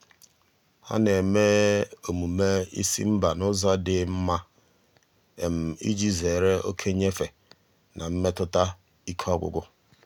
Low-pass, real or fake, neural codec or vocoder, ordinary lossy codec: 19.8 kHz; real; none; none